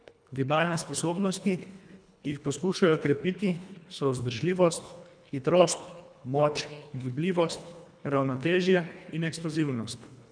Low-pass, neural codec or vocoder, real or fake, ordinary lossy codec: 9.9 kHz; codec, 24 kHz, 1.5 kbps, HILCodec; fake; none